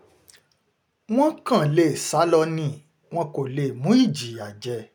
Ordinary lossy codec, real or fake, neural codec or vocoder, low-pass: none; real; none; none